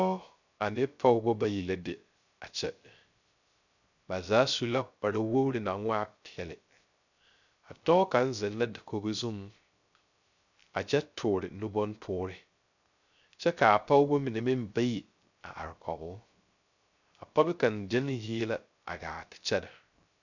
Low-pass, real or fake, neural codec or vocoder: 7.2 kHz; fake; codec, 16 kHz, 0.3 kbps, FocalCodec